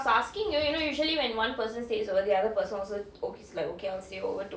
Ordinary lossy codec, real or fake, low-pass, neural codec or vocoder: none; real; none; none